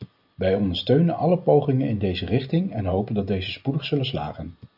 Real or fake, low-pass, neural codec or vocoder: real; 5.4 kHz; none